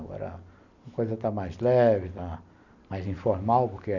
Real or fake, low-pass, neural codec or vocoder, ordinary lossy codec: real; 7.2 kHz; none; none